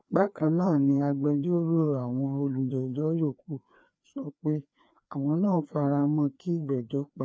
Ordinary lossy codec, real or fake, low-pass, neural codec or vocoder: none; fake; none; codec, 16 kHz, 2 kbps, FreqCodec, larger model